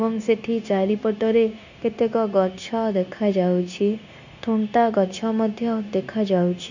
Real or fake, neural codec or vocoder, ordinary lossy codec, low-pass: fake; codec, 16 kHz, 0.9 kbps, LongCat-Audio-Codec; none; 7.2 kHz